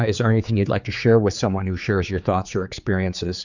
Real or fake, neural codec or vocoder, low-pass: fake; codec, 16 kHz, 4 kbps, X-Codec, HuBERT features, trained on general audio; 7.2 kHz